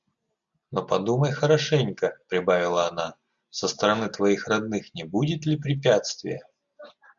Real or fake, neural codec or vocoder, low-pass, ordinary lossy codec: real; none; 7.2 kHz; MP3, 96 kbps